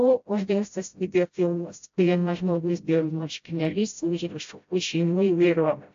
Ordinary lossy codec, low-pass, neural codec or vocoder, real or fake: AAC, 48 kbps; 7.2 kHz; codec, 16 kHz, 0.5 kbps, FreqCodec, smaller model; fake